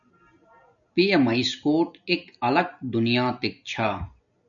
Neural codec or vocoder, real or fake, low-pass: none; real; 7.2 kHz